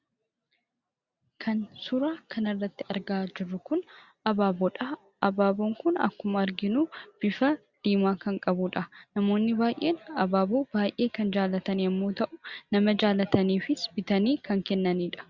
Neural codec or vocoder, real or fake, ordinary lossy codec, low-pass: none; real; Opus, 64 kbps; 7.2 kHz